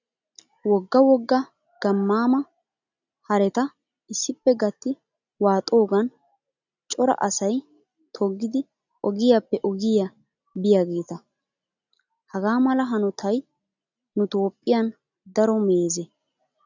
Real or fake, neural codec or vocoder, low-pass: real; none; 7.2 kHz